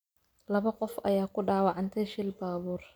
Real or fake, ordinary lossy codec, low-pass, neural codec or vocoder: real; none; none; none